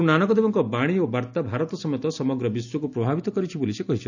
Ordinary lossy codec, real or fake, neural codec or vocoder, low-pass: none; real; none; 7.2 kHz